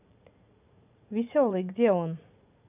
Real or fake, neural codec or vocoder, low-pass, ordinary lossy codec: fake; vocoder, 44.1 kHz, 80 mel bands, Vocos; 3.6 kHz; none